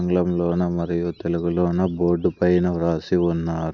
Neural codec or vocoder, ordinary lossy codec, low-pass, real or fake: none; none; 7.2 kHz; real